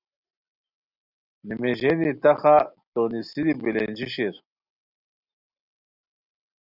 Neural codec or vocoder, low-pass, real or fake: none; 5.4 kHz; real